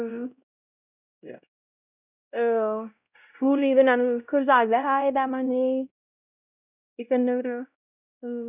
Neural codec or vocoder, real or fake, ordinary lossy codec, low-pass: codec, 16 kHz, 0.5 kbps, X-Codec, WavLM features, trained on Multilingual LibriSpeech; fake; none; 3.6 kHz